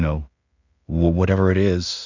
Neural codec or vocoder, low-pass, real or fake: codec, 16 kHz in and 24 kHz out, 0.4 kbps, LongCat-Audio-Codec, two codebook decoder; 7.2 kHz; fake